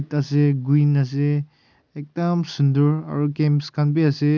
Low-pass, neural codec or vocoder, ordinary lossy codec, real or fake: 7.2 kHz; none; none; real